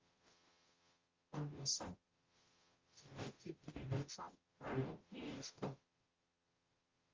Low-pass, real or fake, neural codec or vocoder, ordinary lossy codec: 7.2 kHz; fake; codec, 44.1 kHz, 0.9 kbps, DAC; Opus, 32 kbps